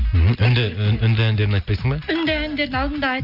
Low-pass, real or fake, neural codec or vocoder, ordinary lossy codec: 5.4 kHz; real; none; none